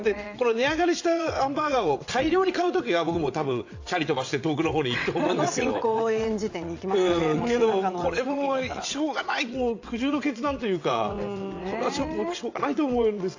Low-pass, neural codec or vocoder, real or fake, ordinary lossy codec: 7.2 kHz; vocoder, 22.05 kHz, 80 mel bands, WaveNeXt; fake; AAC, 48 kbps